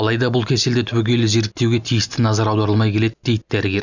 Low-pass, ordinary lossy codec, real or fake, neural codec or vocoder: 7.2 kHz; none; real; none